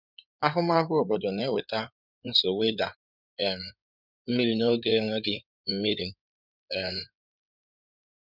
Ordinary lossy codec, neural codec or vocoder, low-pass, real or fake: none; codec, 16 kHz in and 24 kHz out, 2.2 kbps, FireRedTTS-2 codec; 5.4 kHz; fake